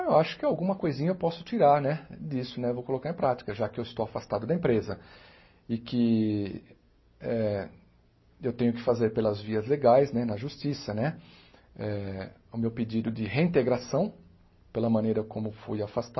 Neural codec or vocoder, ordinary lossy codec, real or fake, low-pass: none; MP3, 24 kbps; real; 7.2 kHz